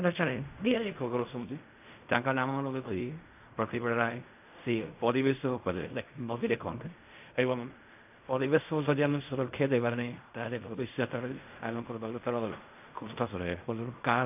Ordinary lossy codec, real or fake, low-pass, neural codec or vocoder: AAC, 32 kbps; fake; 3.6 kHz; codec, 16 kHz in and 24 kHz out, 0.4 kbps, LongCat-Audio-Codec, fine tuned four codebook decoder